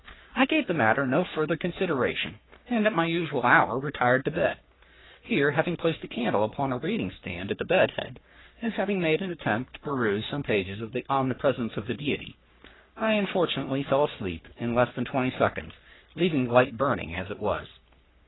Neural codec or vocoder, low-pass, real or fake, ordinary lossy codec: codec, 44.1 kHz, 3.4 kbps, Pupu-Codec; 7.2 kHz; fake; AAC, 16 kbps